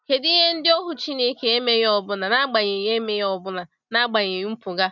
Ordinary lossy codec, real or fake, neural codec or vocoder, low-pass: none; real; none; 7.2 kHz